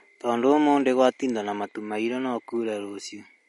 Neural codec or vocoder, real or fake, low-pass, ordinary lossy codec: none; real; 19.8 kHz; MP3, 48 kbps